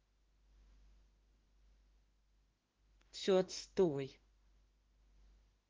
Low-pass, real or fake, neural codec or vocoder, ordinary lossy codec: 7.2 kHz; fake; autoencoder, 48 kHz, 32 numbers a frame, DAC-VAE, trained on Japanese speech; Opus, 16 kbps